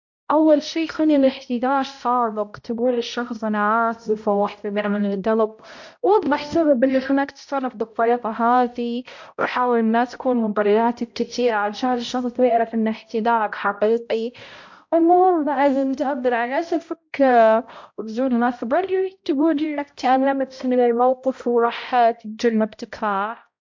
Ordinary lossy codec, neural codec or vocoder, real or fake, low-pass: MP3, 48 kbps; codec, 16 kHz, 0.5 kbps, X-Codec, HuBERT features, trained on balanced general audio; fake; 7.2 kHz